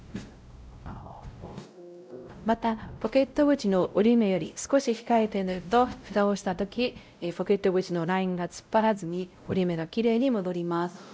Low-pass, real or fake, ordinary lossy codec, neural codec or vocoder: none; fake; none; codec, 16 kHz, 0.5 kbps, X-Codec, WavLM features, trained on Multilingual LibriSpeech